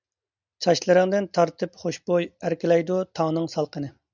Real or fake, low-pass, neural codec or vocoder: real; 7.2 kHz; none